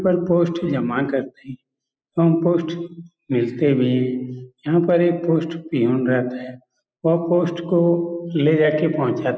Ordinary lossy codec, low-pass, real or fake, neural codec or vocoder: none; none; real; none